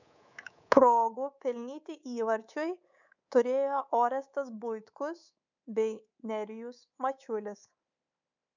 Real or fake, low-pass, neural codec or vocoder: fake; 7.2 kHz; codec, 24 kHz, 3.1 kbps, DualCodec